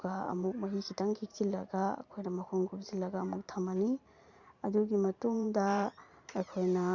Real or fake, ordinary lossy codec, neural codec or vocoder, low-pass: fake; Opus, 64 kbps; vocoder, 44.1 kHz, 128 mel bands every 512 samples, BigVGAN v2; 7.2 kHz